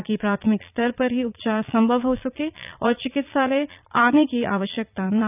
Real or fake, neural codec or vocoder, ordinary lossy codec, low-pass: fake; vocoder, 22.05 kHz, 80 mel bands, Vocos; none; 3.6 kHz